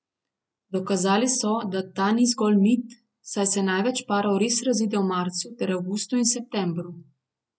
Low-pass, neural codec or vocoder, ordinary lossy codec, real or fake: none; none; none; real